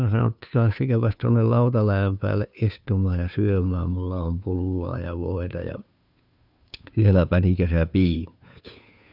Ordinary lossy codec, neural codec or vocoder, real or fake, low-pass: none; codec, 16 kHz, 2 kbps, FunCodec, trained on Chinese and English, 25 frames a second; fake; 5.4 kHz